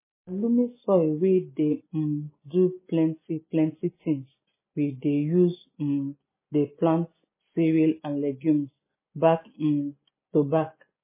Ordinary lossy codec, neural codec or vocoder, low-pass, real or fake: MP3, 16 kbps; none; 3.6 kHz; real